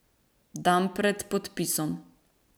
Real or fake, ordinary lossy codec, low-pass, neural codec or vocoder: real; none; none; none